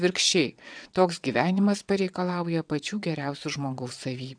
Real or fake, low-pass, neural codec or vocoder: fake; 9.9 kHz; vocoder, 22.05 kHz, 80 mel bands, WaveNeXt